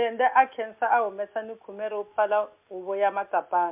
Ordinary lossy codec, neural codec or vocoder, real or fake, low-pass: MP3, 32 kbps; none; real; 3.6 kHz